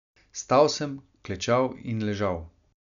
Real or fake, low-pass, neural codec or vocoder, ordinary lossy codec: real; 7.2 kHz; none; none